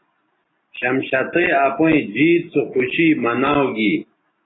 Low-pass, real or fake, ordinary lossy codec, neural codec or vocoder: 7.2 kHz; real; AAC, 16 kbps; none